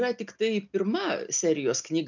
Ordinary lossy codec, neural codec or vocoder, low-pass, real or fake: MP3, 64 kbps; none; 7.2 kHz; real